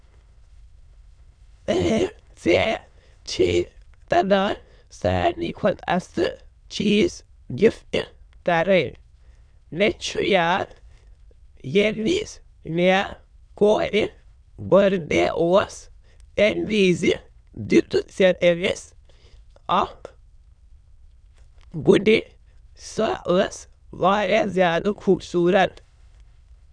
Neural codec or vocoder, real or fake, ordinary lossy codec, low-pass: autoencoder, 22.05 kHz, a latent of 192 numbers a frame, VITS, trained on many speakers; fake; none; 9.9 kHz